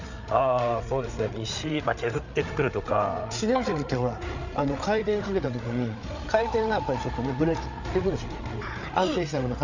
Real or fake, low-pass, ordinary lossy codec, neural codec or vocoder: fake; 7.2 kHz; none; codec, 16 kHz, 8 kbps, FreqCodec, larger model